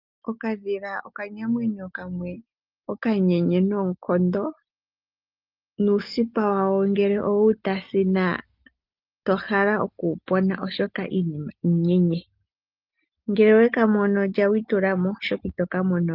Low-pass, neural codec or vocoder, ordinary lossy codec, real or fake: 5.4 kHz; none; Opus, 32 kbps; real